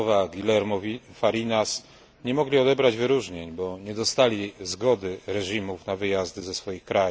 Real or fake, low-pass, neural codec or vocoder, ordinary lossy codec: real; none; none; none